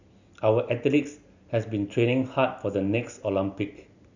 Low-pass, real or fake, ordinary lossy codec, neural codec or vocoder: 7.2 kHz; real; Opus, 64 kbps; none